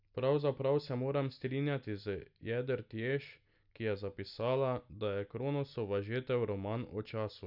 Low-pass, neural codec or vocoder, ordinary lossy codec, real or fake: 5.4 kHz; none; none; real